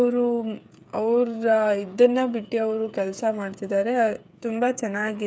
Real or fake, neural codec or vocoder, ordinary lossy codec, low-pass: fake; codec, 16 kHz, 8 kbps, FreqCodec, smaller model; none; none